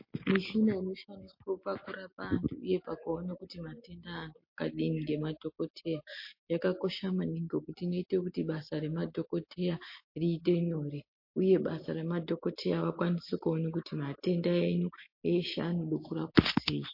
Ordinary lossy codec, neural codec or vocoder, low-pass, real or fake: MP3, 32 kbps; none; 5.4 kHz; real